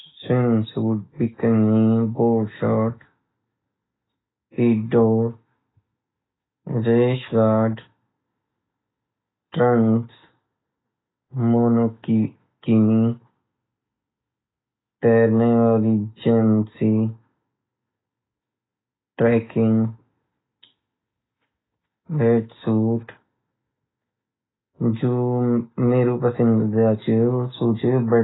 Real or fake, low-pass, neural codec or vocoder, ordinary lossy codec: real; 7.2 kHz; none; AAC, 16 kbps